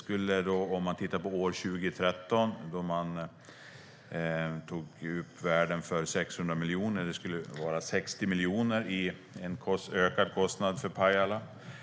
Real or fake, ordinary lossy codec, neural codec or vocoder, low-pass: real; none; none; none